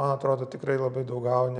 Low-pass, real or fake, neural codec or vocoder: 9.9 kHz; real; none